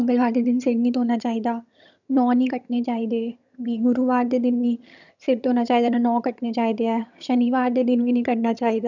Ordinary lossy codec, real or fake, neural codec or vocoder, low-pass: none; fake; vocoder, 22.05 kHz, 80 mel bands, HiFi-GAN; 7.2 kHz